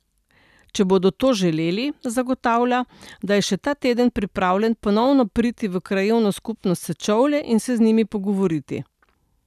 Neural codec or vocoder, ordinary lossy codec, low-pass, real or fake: none; none; 14.4 kHz; real